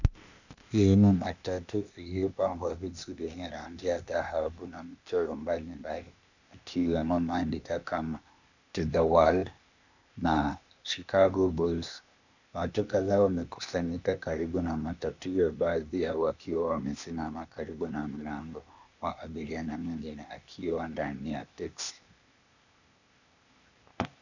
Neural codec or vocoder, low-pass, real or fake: codec, 16 kHz, 0.8 kbps, ZipCodec; 7.2 kHz; fake